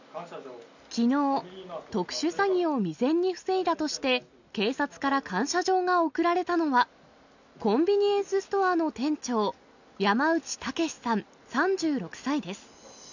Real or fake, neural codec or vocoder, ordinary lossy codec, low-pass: real; none; none; 7.2 kHz